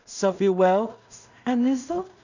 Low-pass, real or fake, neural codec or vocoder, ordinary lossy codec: 7.2 kHz; fake; codec, 16 kHz in and 24 kHz out, 0.4 kbps, LongCat-Audio-Codec, two codebook decoder; none